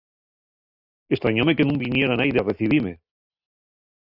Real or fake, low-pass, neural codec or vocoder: real; 5.4 kHz; none